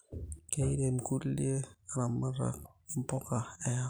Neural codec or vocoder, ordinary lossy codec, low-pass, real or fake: none; none; none; real